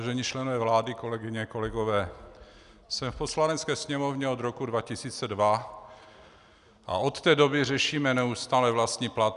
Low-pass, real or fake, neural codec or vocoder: 10.8 kHz; real; none